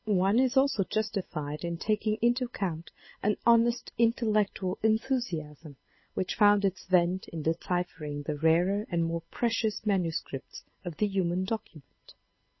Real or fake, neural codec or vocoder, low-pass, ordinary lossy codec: real; none; 7.2 kHz; MP3, 24 kbps